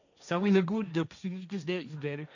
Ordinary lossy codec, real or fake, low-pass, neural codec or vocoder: none; fake; 7.2 kHz; codec, 16 kHz, 1.1 kbps, Voila-Tokenizer